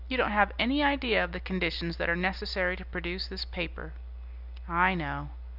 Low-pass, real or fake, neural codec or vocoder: 5.4 kHz; real; none